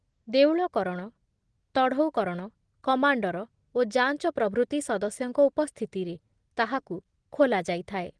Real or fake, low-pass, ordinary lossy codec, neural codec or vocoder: real; 9.9 kHz; Opus, 16 kbps; none